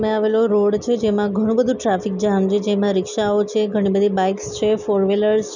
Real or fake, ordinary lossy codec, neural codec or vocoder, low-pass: real; none; none; 7.2 kHz